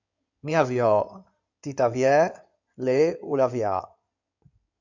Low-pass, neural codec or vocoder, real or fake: 7.2 kHz; codec, 16 kHz in and 24 kHz out, 2.2 kbps, FireRedTTS-2 codec; fake